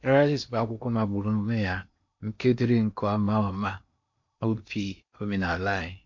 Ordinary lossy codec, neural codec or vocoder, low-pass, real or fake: MP3, 48 kbps; codec, 16 kHz in and 24 kHz out, 0.8 kbps, FocalCodec, streaming, 65536 codes; 7.2 kHz; fake